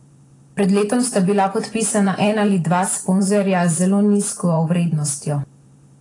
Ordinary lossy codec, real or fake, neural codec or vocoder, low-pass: AAC, 32 kbps; fake; vocoder, 44.1 kHz, 128 mel bands every 512 samples, BigVGAN v2; 10.8 kHz